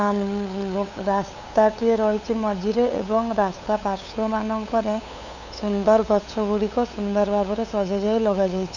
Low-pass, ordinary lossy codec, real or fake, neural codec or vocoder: 7.2 kHz; none; fake; codec, 16 kHz, 8 kbps, FunCodec, trained on LibriTTS, 25 frames a second